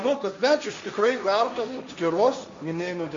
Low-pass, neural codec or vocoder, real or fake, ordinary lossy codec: 7.2 kHz; codec, 16 kHz, 1.1 kbps, Voila-Tokenizer; fake; MP3, 48 kbps